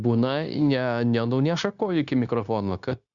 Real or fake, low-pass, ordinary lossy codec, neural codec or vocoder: fake; 7.2 kHz; Opus, 64 kbps; codec, 16 kHz, 0.9 kbps, LongCat-Audio-Codec